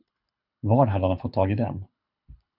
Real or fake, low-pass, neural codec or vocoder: fake; 5.4 kHz; codec, 24 kHz, 6 kbps, HILCodec